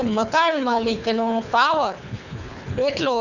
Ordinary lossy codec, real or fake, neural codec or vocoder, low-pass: none; fake; codec, 24 kHz, 3 kbps, HILCodec; 7.2 kHz